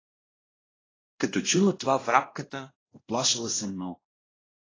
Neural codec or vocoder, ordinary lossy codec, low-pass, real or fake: codec, 16 kHz, 1 kbps, X-Codec, WavLM features, trained on Multilingual LibriSpeech; AAC, 32 kbps; 7.2 kHz; fake